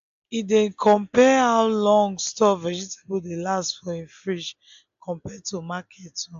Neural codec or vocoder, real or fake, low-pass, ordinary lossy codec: none; real; 7.2 kHz; AAC, 64 kbps